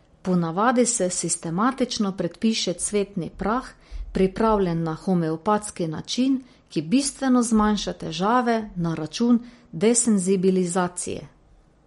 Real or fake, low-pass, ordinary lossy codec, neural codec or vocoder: real; 19.8 kHz; MP3, 48 kbps; none